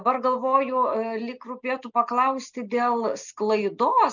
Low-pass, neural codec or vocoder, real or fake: 7.2 kHz; none; real